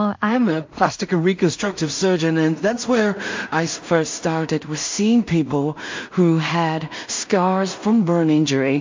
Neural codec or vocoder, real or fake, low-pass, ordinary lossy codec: codec, 16 kHz in and 24 kHz out, 0.4 kbps, LongCat-Audio-Codec, two codebook decoder; fake; 7.2 kHz; MP3, 48 kbps